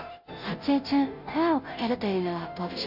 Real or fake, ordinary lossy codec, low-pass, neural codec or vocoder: fake; AAC, 48 kbps; 5.4 kHz; codec, 16 kHz, 0.5 kbps, FunCodec, trained on Chinese and English, 25 frames a second